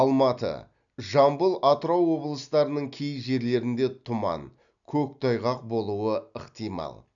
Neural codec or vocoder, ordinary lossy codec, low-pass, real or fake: none; none; 7.2 kHz; real